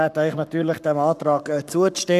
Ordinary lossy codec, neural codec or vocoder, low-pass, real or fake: none; codec, 44.1 kHz, 7.8 kbps, Pupu-Codec; 14.4 kHz; fake